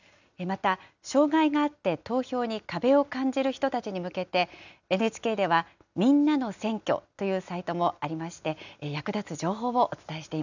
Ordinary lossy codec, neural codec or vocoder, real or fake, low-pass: none; none; real; 7.2 kHz